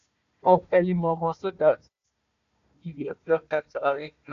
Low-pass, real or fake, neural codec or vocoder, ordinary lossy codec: 7.2 kHz; fake; codec, 16 kHz, 1 kbps, FunCodec, trained on Chinese and English, 50 frames a second; none